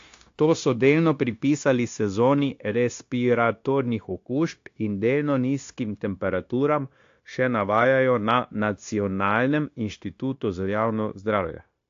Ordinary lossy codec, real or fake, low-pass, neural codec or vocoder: AAC, 48 kbps; fake; 7.2 kHz; codec, 16 kHz, 0.9 kbps, LongCat-Audio-Codec